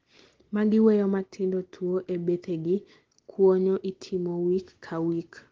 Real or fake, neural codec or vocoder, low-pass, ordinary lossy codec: real; none; 7.2 kHz; Opus, 16 kbps